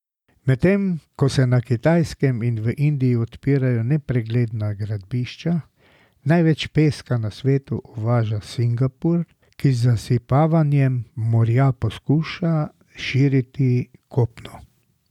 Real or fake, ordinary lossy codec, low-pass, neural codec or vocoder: real; none; 19.8 kHz; none